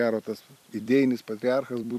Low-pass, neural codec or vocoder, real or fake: 14.4 kHz; vocoder, 44.1 kHz, 128 mel bands every 256 samples, BigVGAN v2; fake